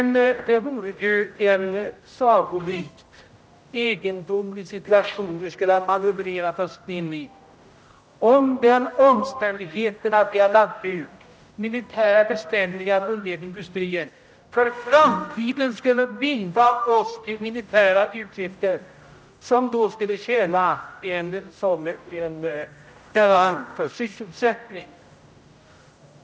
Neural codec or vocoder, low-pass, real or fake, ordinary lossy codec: codec, 16 kHz, 0.5 kbps, X-Codec, HuBERT features, trained on general audio; none; fake; none